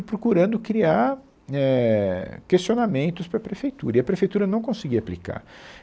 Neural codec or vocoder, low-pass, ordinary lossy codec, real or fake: none; none; none; real